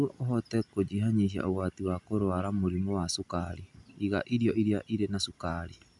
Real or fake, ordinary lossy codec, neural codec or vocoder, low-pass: real; none; none; 10.8 kHz